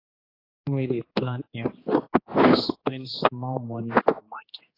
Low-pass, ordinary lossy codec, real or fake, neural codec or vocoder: 5.4 kHz; AAC, 24 kbps; fake; codec, 16 kHz, 2 kbps, X-Codec, HuBERT features, trained on general audio